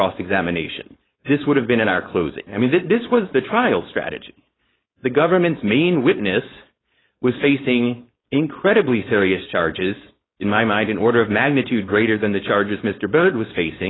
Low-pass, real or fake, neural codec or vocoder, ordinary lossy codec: 7.2 kHz; fake; codec, 16 kHz, 4.8 kbps, FACodec; AAC, 16 kbps